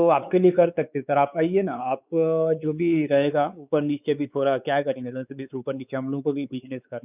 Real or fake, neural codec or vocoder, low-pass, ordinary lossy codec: fake; codec, 16 kHz, 4 kbps, X-Codec, WavLM features, trained on Multilingual LibriSpeech; 3.6 kHz; none